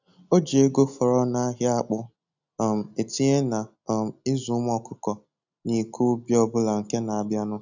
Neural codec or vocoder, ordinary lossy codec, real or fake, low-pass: none; none; real; 7.2 kHz